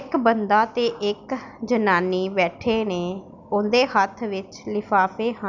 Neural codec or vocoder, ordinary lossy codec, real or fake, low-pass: none; none; real; 7.2 kHz